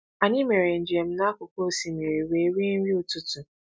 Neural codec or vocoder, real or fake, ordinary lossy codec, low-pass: none; real; none; none